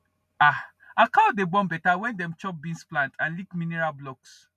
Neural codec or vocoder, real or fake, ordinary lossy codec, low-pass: none; real; none; 14.4 kHz